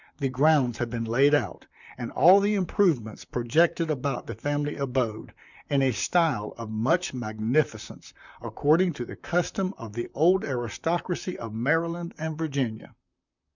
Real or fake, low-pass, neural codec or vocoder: fake; 7.2 kHz; vocoder, 44.1 kHz, 128 mel bands, Pupu-Vocoder